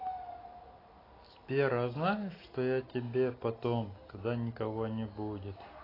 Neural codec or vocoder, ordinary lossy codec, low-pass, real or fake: none; AAC, 24 kbps; 5.4 kHz; real